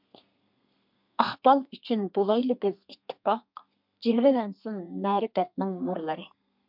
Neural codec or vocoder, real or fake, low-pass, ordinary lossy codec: codec, 32 kHz, 1.9 kbps, SNAC; fake; 5.4 kHz; MP3, 48 kbps